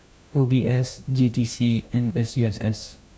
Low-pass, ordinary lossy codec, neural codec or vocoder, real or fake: none; none; codec, 16 kHz, 1 kbps, FunCodec, trained on LibriTTS, 50 frames a second; fake